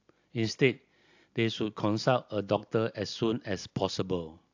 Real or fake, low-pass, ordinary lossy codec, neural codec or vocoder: real; 7.2 kHz; AAC, 48 kbps; none